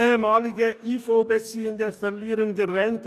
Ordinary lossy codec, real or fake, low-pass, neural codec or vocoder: none; fake; 14.4 kHz; codec, 44.1 kHz, 2.6 kbps, DAC